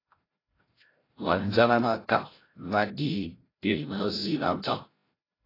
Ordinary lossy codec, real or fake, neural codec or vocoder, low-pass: AAC, 24 kbps; fake; codec, 16 kHz, 0.5 kbps, FreqCodec, larger model; 5.4 kHz